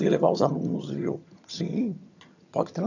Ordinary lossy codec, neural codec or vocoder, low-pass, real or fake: none; vocoder, 22.05 kHz, 80 mel bands, HiFi-GAN; 7.2 kHz; fake